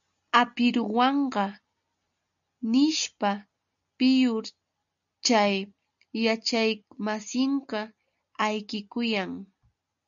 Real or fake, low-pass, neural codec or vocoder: real; 7.2 kHz; none